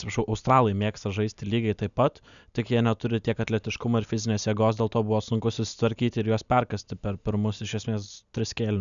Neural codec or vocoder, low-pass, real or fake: none; 7.2 kHz; real